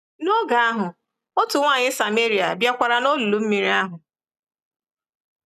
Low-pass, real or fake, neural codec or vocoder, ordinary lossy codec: 14.4 kHz; real; none; none